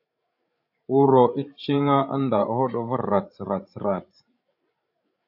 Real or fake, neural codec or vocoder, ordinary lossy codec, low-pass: fake; codec, 16 kHz, 16 kbps, FreqCodec, larger model; AAC, 48 kbps; 5.4 kHz